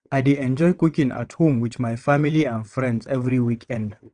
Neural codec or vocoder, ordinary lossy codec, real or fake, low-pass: vocoder, 22.05 kHz, 80 mel bands, WaveNeXt; none; fake; 9.9 kHz